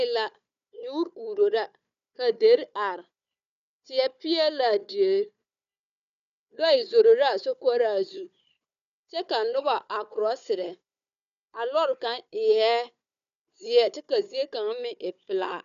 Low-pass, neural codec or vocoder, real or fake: 7.2 kHz; codec, 16 kHz, 4 kbps, FunCodec, trained on Chinese and English, 50 frames a second; fake